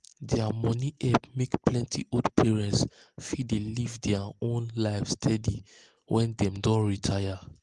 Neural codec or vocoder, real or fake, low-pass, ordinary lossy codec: none; real; 10.8 kHz; Opus, 24 kbps